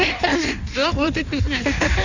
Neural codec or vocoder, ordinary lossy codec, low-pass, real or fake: codec, 16 kHz in and 24 kHz out, 1.1 kbps, FireRedTTS-2 codec; none; 7.2 kHz; fake